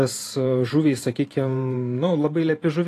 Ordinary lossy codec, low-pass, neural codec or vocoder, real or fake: AAC, 48 kbps; 14.4 kHz; vocoder, 44.1 kHz, 128 mel bands every 512 samples, BigVGAN v2; fake